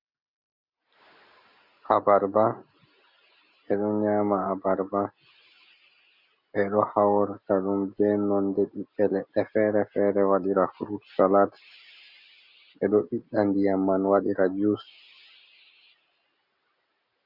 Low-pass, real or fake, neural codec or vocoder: 5.4 kHz; real; none